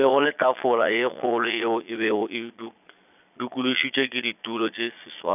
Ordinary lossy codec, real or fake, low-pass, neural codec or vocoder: none; fake; 3.6 kHz; vocoder, 22.05 kHz, 80 mel bands, Vocos